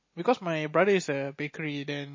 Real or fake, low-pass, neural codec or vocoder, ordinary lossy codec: real; 7.2 kHz; none; MP3, 32 kbps